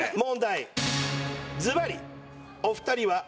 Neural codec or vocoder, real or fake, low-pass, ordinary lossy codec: none; real; none; none